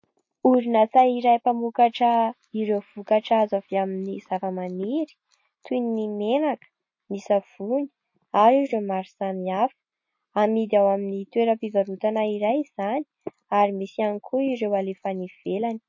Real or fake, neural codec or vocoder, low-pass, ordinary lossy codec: real; none; 7.2 kHz; MP3, 32 kbps